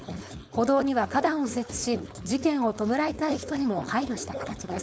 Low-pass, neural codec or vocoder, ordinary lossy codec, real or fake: none; codec, 16 kHz, 4.8 kbps, FACodec; none; fake